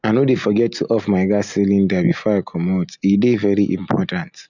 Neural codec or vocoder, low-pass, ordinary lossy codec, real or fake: none; 7.2 kHz; none; real